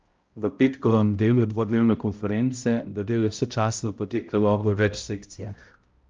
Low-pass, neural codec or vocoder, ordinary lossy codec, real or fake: 7.2 kHz; codec, 16 kHz, 0.5 kbps, X-Codec, HuBERT features, trained on balanced general audio; Opus, 24 kbps; fake